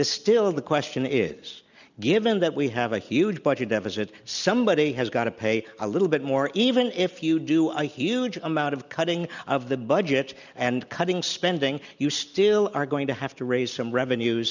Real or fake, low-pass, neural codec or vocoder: real; 7.2 kHz; none